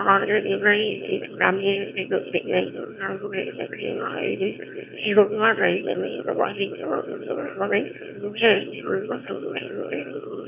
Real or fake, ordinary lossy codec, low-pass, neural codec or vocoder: fake; none; 3.6 kHz; autoencoder, 22.05 kHz, a latent of 192 numbers a frame, VITS, trained on one speaker